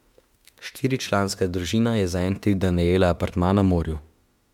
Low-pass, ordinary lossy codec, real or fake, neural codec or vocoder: 19.8 kHz; MP3, 96 kbps; fake; autoencoder, 48 kHz, 32 numbers a frame, DAC-VAE, trained on Japanese speech